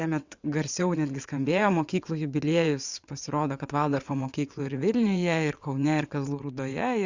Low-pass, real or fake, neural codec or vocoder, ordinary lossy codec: 7.2 kHz; fake; vocoder, 22.05 kHz, 80 mel bands, WaveNeXt; Opus, 64 kbps